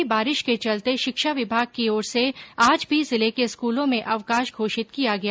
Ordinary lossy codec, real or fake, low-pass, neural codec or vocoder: none; real; none; none